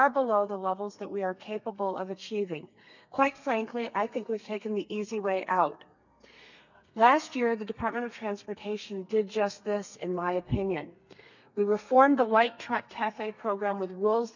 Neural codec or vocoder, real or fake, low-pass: codec, 32 kHz, 1.9 kbps, SNAC; fake; 7.2 kHz